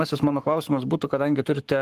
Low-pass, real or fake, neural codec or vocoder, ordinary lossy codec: 14.4 kHz; fake; codec, 44.1 kHz, 7.8 kbps, Pupu-Codec; Opus, 24 kbps